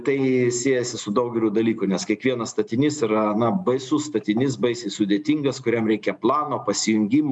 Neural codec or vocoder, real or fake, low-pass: none; real; 10.8 kHz